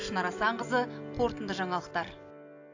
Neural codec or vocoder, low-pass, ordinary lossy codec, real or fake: none; 7.2 kHz; AAC, 48 kbps; real